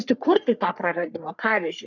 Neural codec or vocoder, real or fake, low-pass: codec, 44.1 kHz, 3.4 kbps, Pupu-Codec; fake; 7.2 kHz